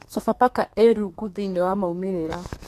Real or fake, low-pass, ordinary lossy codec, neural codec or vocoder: fake; 14.4 kHz; AAC, 64 kbps; codec, 32 kHz, 1.9 kbps, SNAC